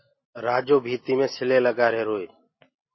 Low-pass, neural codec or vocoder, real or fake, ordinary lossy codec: 7.2 kHz; none; real; MP3, 24 kbps